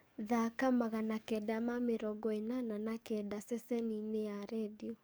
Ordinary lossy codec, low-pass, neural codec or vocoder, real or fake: none; none; codec, 44.1 kHz, 7.8 kbps, DAC; fake